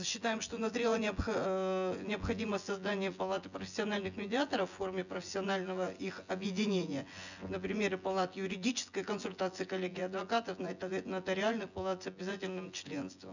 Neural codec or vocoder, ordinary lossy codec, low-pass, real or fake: vocoder, 24 kHz, 100 mel bands, Vocos; none; 7.2 kHz; fake